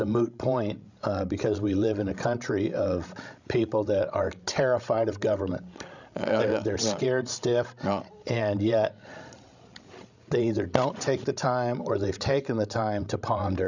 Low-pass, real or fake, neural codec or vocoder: 7.2 kHz; fake; codec, 16 kHz, 16 kbps, FreqCodec, larger model